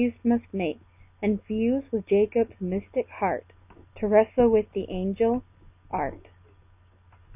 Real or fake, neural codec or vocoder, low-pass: real; none; 3.6 kHz